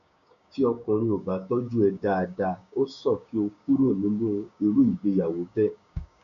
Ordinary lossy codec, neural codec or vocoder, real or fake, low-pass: none; codec, 16 kHz, 6 kbps, DAC; fake; 7.2 kHz